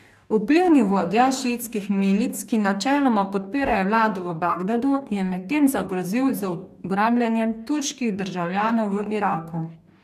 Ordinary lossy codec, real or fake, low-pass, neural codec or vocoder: none; fake; 14.4 kHz; codec, 44.1 kHz, 2.6 kbps, DAC